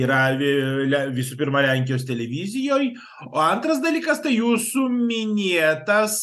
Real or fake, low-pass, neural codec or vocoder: real; 14.4 kHz; none